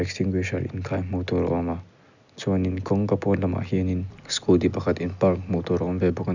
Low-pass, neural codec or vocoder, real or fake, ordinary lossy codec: 7.2 kHz; none; real; none